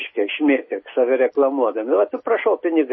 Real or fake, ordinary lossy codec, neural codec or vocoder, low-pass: real; MP3, 24 kbps; none; 7.2 kHz